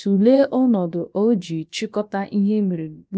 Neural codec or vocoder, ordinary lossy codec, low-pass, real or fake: codec, 16 kHz, about 1 kbps, DyCAST, with the encoder's durations; none; none; fake